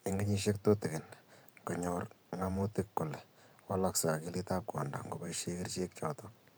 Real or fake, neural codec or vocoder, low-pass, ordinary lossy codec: fake; vocoder, 44.1 kHz, 128 mel bands every 512 samples, BigVGAN v2; none; none